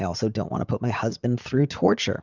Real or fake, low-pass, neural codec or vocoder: fake; 7.2 kHz; vocoder, 44.1 kHz, 80 mel bands, Vocos